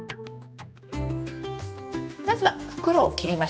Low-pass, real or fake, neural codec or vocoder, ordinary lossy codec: none; fake; codec, 16 kHz, 2 kbps, X-Codec, HuBERT features, trained on general audio; none